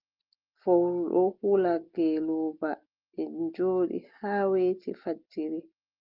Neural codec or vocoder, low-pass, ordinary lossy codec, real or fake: none; 5.4 kHz; Opus, 32 kbps; real